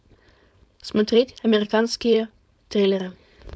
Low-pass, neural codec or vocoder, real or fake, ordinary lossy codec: none; codec, 16 kHz, 4.8 kbps, FACodec; fake; none